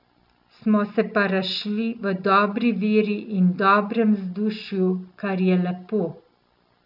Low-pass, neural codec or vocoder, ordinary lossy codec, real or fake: 5.4 kHz; none; none; real